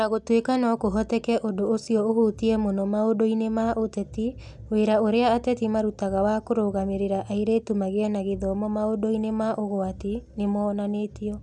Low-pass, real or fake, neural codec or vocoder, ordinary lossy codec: none; real; none; none